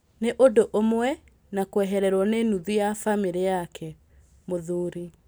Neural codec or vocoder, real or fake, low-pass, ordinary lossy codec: none; real; none; none